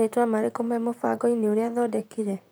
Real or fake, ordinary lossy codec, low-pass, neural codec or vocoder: fake; none; none; vocoder, 44.1 kHz, 128 mel bands, Pupu-Vocoder